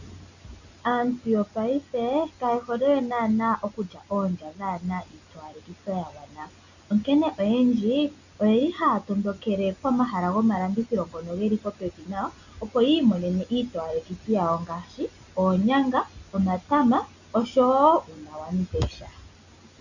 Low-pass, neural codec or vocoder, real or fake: 7.2 kHz; none; real